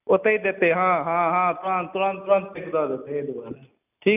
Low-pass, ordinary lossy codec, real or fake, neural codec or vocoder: 3.6 kHz; none; real; none